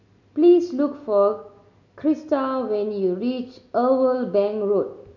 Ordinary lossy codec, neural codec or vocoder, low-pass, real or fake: none; none; 7.2 kHz; real